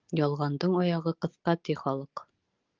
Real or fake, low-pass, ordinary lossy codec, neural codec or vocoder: real; 7.2 kHz; Opus, 32 kbps; none